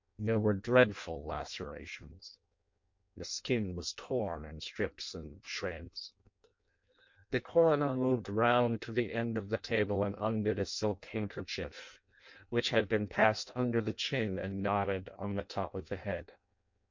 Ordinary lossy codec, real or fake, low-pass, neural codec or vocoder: MP3, 48 kbps; fake; 7.2 kHz; codec, 16 kHz in and 24 kHz out, 0.6 kbps, FireRedTTS-2 codec